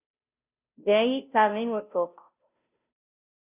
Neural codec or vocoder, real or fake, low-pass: codec, 16 kHz, 0.5 kbps, FunCodec, trained on Chinese and English, 25 frames a second; fake; 3.6 kHz